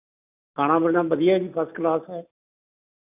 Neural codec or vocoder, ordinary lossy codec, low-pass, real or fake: none; none; 3.6 kHz; real